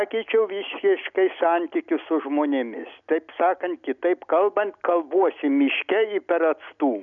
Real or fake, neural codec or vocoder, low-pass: real; none; 7.2 kHz